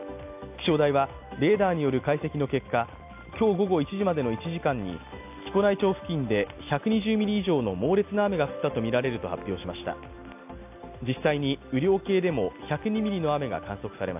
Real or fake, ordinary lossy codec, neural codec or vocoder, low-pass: real; AAC, 32 kbps; none; 3.6 kHz